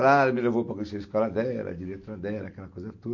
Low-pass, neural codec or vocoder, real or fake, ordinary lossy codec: 7.2 kHz; none; real; MP3, 48 kbps